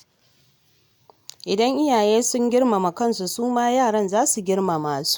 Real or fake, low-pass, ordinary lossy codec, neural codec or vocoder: real; none; none; none